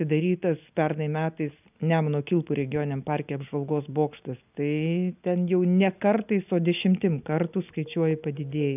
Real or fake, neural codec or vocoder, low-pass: real; none; 3.6 kHz